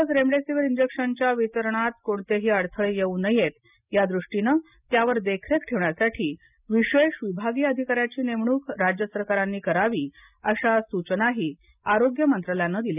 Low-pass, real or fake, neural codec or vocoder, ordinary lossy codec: 3.6 kHz; real; none; none